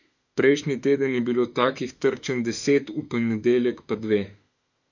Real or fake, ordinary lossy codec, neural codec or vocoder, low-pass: fake; none; autoencoder, 48 kHz, 32 numbers a frame, DAC-VAE, trained on Japanese speech; 7.2 kHz